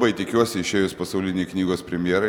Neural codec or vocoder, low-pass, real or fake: none; 19.8 kHz; real